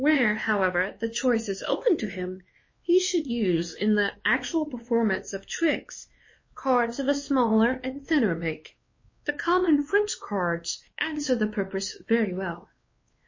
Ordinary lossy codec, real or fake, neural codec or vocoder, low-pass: MP3, 32 kbps; fake; codec, 16 kHz, 2 kbps, X-Codec, WavLM features, trained on Multilingual LibriSpeech; 7.2 kHz